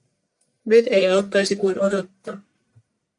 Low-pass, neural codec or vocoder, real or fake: 10.8 kHz; codec, 44.1 kHz, 1.7 kbps, Pupu-Codec; fake